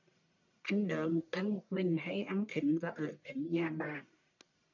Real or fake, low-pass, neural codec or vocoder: fake; 7.2 kHz; codec, 44.1 kHz, 1.7 kbps, Pupu-Codec